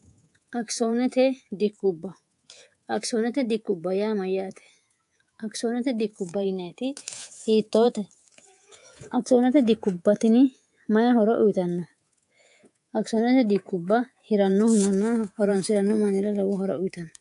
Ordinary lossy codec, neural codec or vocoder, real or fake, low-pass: AAC, 64 kbps; codec, 24 kHz, 3.1 kbps, DualCodec; fake; 10.8 kHz